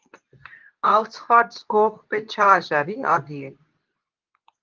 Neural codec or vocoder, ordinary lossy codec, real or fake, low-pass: codec, 24 kHz, 0.9 kbps, WavTokenizer, medium speech release version 2; Opus, 24 kbps; fake; 7.2 kHz